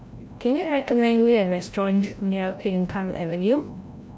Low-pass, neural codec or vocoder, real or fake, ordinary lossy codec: none; codec, 16 kHz, 0.5 kbps, FreqCodec, larger model; fake; none